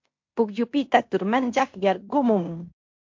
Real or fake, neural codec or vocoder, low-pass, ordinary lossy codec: fake; codec, 16 kHz in and 24 kHz out, 0.9 kbps, LongCat-Audio-Codec, fine tuned four codebook decoder; 7.2 kHz; MP3, 48 kbps